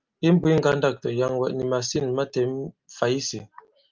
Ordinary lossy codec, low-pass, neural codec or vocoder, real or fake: Opus, 24 kbps; 7.2 kHz; none; real